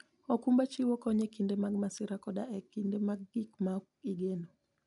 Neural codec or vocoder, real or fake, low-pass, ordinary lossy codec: none; real; 10.8 kHz; none